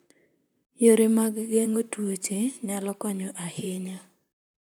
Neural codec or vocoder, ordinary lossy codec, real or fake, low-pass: vocoder, 44.1 kHz, 128 mel bands, Pupu-Vocoder; none; fake; none